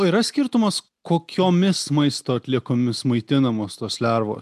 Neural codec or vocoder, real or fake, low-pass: none; real; 14.4 kHz